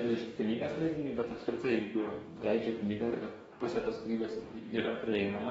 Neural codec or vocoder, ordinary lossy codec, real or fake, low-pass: codec, 44.1 kHz, 2.6 kbps, DAC; AAC, 24 kbps; fake; 19.8 kHz